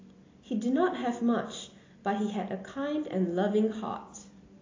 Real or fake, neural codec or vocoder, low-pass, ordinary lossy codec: real; none; 7.2 kHz; AAC, 32 kbps